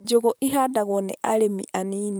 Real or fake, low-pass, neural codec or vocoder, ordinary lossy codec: fake; none; vocoder, 44.1 kHz, 128 mel bands, Pupu-Vocoder; none